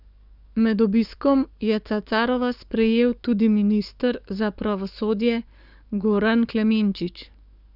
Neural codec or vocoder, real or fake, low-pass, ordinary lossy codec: codec, 44.1 kHz, 7.8 kbps, DAC; fake; 5.4 kHz; none